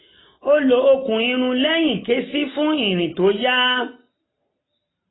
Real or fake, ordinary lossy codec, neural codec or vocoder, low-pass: real; AAC, 16 kbps; none; 7.2 kHz